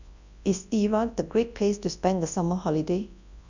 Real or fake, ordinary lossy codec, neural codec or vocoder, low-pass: fake; none; codec, 24 kHz, 0.9 kbps, WavTokenizer, large speech release; 7.2 kHz